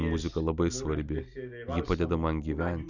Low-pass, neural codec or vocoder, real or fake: 7.2 kHz; none; real